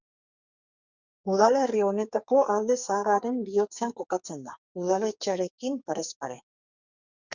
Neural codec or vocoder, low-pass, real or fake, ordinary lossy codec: codec, 44.1 kHz, 2.6 kbps, SNAC; 7.2 kHz; fake; Opus, 64 kbps